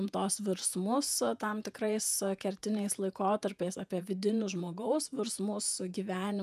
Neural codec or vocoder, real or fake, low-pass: vocoder, 48 kHz, 128 mel bands, Vocos; fake; 14.4 kHz